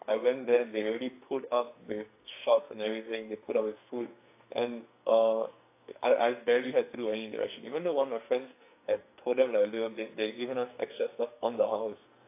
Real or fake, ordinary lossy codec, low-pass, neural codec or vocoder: fake; AAC, 24 kbps; 3.6 kHz; codec, 44.1 kHz, 2.6 kbps, SNAC